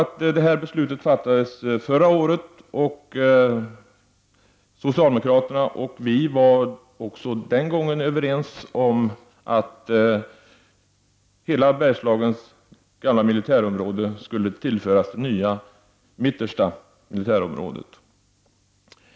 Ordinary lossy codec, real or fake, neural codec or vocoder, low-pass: none; real; none; none